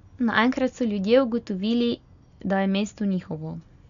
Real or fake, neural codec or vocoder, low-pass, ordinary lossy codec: real; none; 7.2 kHz; none